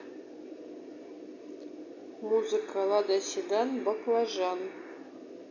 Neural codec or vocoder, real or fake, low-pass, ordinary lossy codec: none; real; 7.2 kHz; none